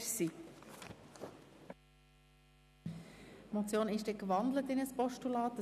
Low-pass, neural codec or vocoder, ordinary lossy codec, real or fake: 14.4 kHz; none; none; real